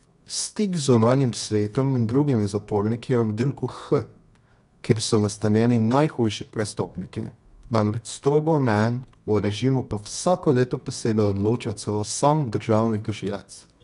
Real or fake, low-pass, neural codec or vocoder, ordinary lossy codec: fake; 10.8 kHz; codec, 24 kHz, 0.9 kbps, WavTokenizer, medium music audio release; none